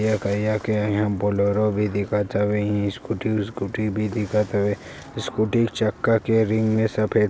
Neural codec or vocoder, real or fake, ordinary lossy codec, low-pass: none; real; none; none